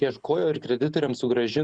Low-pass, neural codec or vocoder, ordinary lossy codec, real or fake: 9.9 kHz; none; Opus, 64 kbps; real